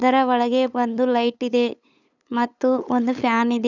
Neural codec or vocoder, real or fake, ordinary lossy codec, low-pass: codec, 16 kHz, 8 kbps, FunCodec, trained on LibriTTS, 25 frames a second; fake; none; 7.2 kHz